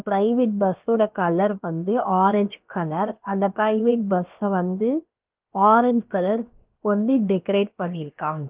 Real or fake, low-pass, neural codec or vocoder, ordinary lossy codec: fake; 3.6 kHz; codec, 16 kHz, about 1 kbps, DyCAST, with the encoder's durations; Opus, 32 kbps